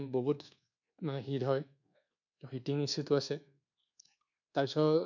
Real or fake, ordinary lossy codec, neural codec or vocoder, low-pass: fake; none; codec, 24 kHz, 1.2 kbps, DualCodec; 7.2 kHz